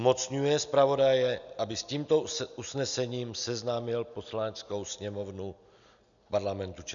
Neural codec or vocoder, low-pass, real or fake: none; 7.2 kHz; real